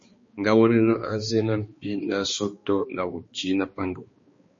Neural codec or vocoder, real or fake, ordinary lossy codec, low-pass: codec, 16 kHz, 2 kbps, X-Codec, HuBERT features, trained on balanced general audio; fake; MP3, 32 kbps; 7.2 kHz